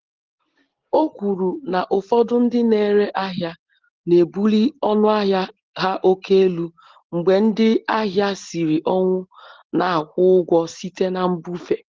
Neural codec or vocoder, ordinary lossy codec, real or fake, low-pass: none; Opus, 16 kbps; real; 7.2 kHz